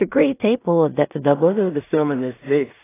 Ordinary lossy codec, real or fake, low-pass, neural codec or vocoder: AAC, 16 kbps; fake; 3.6 kHz; codec, 16 kHz in and 24 kHz out, 0.4 kbps, LongCat-Audio-Codec, two codebook decoder